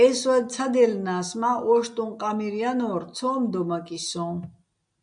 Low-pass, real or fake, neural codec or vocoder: 9.9 kHz; real; none